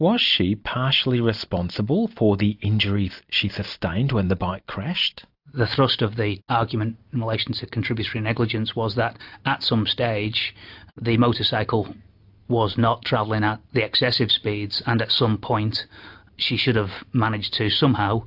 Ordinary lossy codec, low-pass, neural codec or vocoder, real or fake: AAC, 48 kbps; 5.4 kHz; none; real